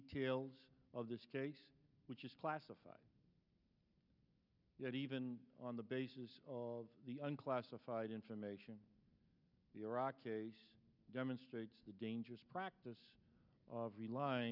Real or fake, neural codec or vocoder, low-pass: real; none; 5.4 kHz